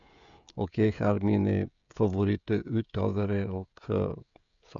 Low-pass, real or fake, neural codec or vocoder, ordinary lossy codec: 7.2 kHz; fake; codec, 16 kHz, 16 kbps, FreqCodec, smaller model; none